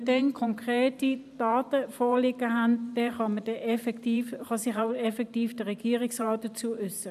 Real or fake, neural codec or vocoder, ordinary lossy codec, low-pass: fake; vocoder, 44.1 kHz, 128 mel bands every 512 samples, BigVGAN v2; none; 14.4 kHz